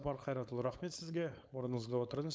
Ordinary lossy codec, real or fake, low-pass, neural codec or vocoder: none; fake; none; codec, 16 kHz, 4.8 kbps, FACodec